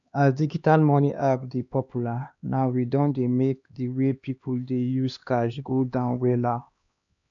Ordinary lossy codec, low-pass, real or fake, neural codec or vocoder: MP3, 64 kbps; 7.2 kHz; fake; codec, 16 kHz, 2 kbps, X-Codec, HuBERT features, trained on LibriSpeech